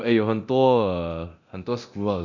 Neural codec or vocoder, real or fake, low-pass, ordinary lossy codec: codec, 24 kHz, 0.9 kbps, DualCodec; fake; 7.2 kHz; none